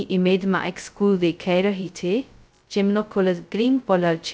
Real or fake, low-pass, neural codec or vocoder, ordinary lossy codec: fake; none; codec, 16 kHz, 0.2 kbps, FocalCodec; none